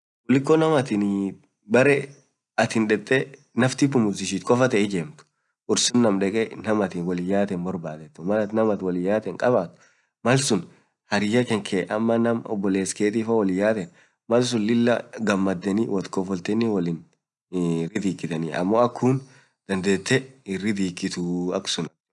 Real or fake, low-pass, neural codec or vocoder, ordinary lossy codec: real; 10.8 kHz; none; none